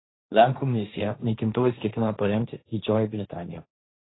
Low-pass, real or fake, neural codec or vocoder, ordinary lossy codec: 7.2 kHz; fake; codec, 16 kHz, 1.1 kbps, Voila-Tokenizer; AAC, 16 kbps